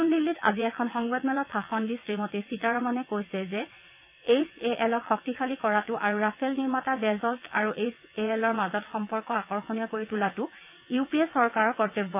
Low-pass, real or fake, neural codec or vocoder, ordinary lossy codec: 3.6 kHz; fake; vocoder, 22.05 kHz, 80 mel bands, WaveNeXt; AAC, 32 kbps